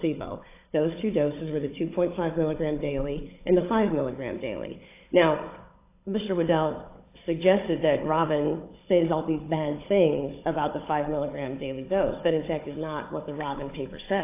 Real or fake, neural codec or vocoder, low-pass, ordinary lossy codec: fake; codec, 16 kHz, 16 kbps, FunCodec, trained on Chinese and English, 50 frames a second; 3.6 kHz; AAC, 24 kbps